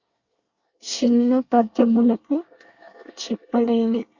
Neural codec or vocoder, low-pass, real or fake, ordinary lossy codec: codec, 24 kHz, 1 kbps, SNAC; 7.2 kHz; fake; Opus, 64 kbps